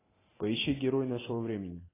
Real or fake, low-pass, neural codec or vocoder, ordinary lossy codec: real; 3.6 kHz; none; AAC, 16 kbps